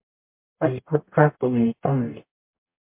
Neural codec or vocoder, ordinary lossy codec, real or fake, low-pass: codec, 44.1 kHz, 0.9 kbps, DAC; MP3, 32 kbps; fake; 3.6 kHz